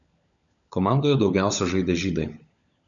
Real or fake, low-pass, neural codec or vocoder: fake; 7.2 kHz; codec, 16 kHz, 16 kbps, FunCodec, trained on LibriTTS, 50 frames a second